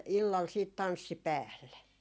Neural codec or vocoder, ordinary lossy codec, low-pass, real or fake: none; none; none; real